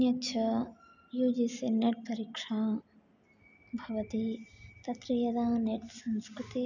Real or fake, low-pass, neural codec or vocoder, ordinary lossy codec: real; 7.2 kHz; none; none